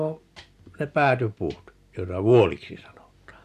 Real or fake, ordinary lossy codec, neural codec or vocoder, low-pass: real; AAC, 96 kbps; none; 14.4 kHz